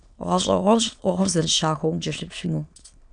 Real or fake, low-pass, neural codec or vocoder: fake; 9.9 kHz; autoencoder, 22.05 kHz, a latent of 192 numbers a frame, VITS, trained on many speakers